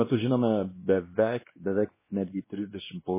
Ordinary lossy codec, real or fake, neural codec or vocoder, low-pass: MP3, 16 kbps; fake; codec, 16 kHz, 2 kbps, X-Codec, WavLM features, trained on Multilingual LibriSpeech; 3.6 kHz